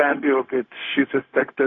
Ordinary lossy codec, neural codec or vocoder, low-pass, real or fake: AAC, 32 kbps; codec, 16 kHz, 0.4 kbps, LongCat-Audio-Codec; 7.2 kHz; fake